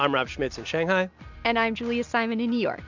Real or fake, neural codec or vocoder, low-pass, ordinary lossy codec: real; none; 7.2 kHz; MP3, 64 kbps